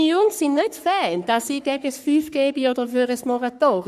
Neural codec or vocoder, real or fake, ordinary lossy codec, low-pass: codec, 44.1 kHz, 3.4 kbps, Pupu-Codec; fake; none; 14.4 kHz